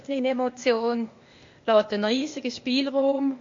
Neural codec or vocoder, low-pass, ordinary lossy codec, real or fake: codec, 16 kHz, 0.8 kbps, ZipCodec; 7.2 kHz; MP3, 48 kbps; fake